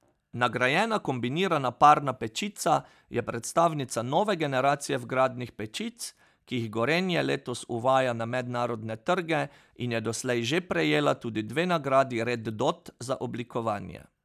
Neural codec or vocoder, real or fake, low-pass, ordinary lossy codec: none; real; 14.4 kHz; none